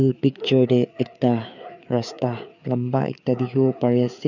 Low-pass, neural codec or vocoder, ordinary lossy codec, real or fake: 7.2 kHz; codec, 16 kHz, 4 kbps, FreqCodec, larger model; none; fake